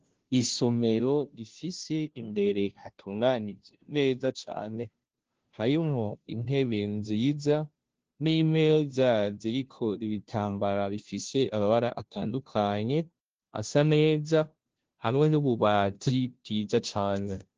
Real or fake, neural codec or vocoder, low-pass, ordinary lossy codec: fake; codec, 16 kHz, 0.5 kbps, FunCodec, trained on Chinese and English, 25 frames a second; 7.2 kHz; Opus, 16 kbps